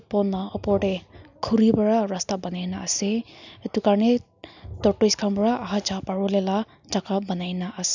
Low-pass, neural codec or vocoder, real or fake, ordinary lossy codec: 7.2 kHz; none; real; none